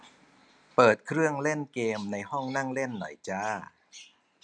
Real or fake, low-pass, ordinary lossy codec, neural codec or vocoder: real; 9.9 kHz; none; none